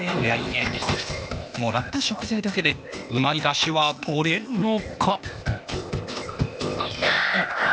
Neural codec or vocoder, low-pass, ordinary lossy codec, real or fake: codec, 16 kHz, 0.8 kbps, ZipCodec; none; none; fake